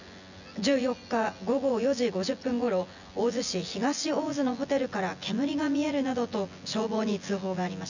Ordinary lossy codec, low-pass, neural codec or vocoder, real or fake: none; 7.2 kHz; vocoder, 24 kHz, 100 mel bands, Vocos; fake